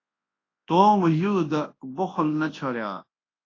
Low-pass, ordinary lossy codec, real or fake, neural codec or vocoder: 7.2 kHz; AAC, 32 kbps; fake; codec, 24 kHz, 0.9 kbps, WavTokenizer, large speech release